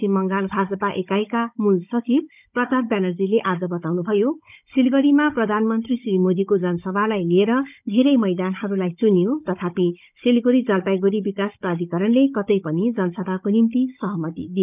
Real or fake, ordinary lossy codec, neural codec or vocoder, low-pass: fake; none; codec, 16 kHz, 16 kbps, FunCodec, trained on Chinese and English, 50 frames a second; 3.6 kHz